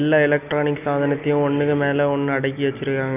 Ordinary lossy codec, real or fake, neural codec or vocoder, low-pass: none; real; none; 3.6 kHz